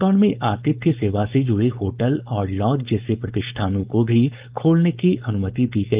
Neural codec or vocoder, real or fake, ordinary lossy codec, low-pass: codec, 16 kHz, 4.8 kbps, FACodec; fake; Opus, 32 kbps; 3.6 kHz